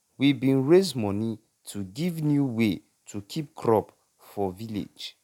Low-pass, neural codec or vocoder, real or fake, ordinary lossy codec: 19.8 kHz; none; real; none